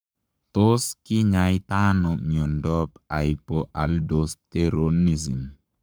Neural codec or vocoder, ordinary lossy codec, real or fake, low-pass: codec, 44.1 kHz, 7.8 kbps, Pupu-Codec; none; fake; none